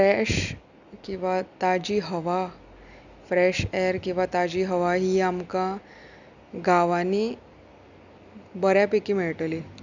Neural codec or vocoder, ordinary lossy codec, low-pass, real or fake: none; none; 7.2 kHz; real